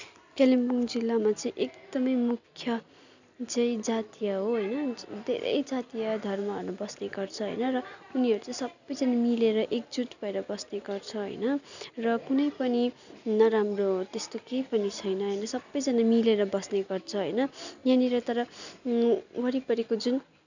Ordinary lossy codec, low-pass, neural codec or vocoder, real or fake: none; 7.2 kHz; none; real